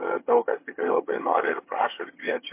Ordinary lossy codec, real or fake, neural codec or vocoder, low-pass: MP3, 24 kbps; fake; vocoder, 22.05 kHz, 80 mel bands, HiFi-GAN; 3.6 kHz